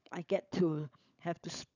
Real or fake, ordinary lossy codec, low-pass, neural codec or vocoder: fake; none; 7.2 kHz; codec, 16 kHz, 16 kbps, FunCodec, trained on LibriTTS, 50 frames a second